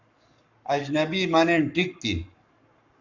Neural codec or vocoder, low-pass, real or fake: codec, 44.1 kHz, 7.8 kbps, Pupu-Codec; 7.2 kHz; fake